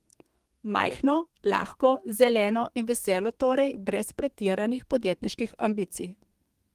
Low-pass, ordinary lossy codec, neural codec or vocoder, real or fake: 14.4 kHz; Opus, 24 kbps; codec, 32 kHz, 1.9 kbps, SNAC; fake